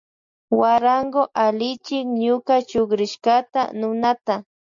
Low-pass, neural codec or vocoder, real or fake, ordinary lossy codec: 7.2 kHz; none; real; MP3, 64 kbps